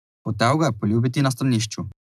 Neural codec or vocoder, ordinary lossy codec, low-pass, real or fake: none; none; 14.4 kHz; real